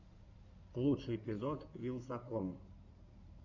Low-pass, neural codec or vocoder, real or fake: 7.2 kHz; codec, 16 kHz in and 24 kHz out, 2.2 kbps, FireRedTTS-2 codec; fake